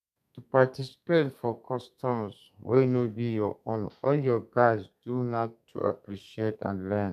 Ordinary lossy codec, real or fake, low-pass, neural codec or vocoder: none; fake; 14.4 kHz; codec, 32 kHz, 1.9 kbps, SNAC